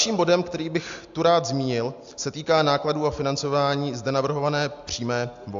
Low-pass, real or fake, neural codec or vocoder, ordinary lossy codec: 7.2 kHz; real; none; AAC, 64 kbps